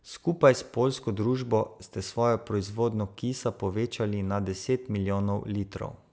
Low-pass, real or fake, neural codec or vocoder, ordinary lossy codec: none; real; none; none